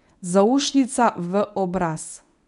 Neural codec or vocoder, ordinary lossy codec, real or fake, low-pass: codec, 24 kHz, 0.9 kbps, WavTokenizer, medium speech release version 1; none; fake; 10.8 kHz